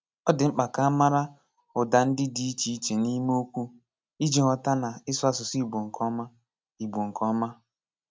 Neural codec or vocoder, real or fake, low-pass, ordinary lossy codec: none; real; none; none